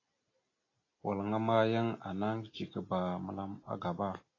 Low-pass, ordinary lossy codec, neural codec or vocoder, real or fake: 7.2 kHz; AAC, 32 kbps; none; real